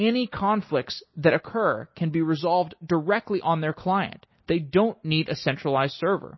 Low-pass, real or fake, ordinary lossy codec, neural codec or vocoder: 7.2 kHz; real; MP3, 24 kbps; none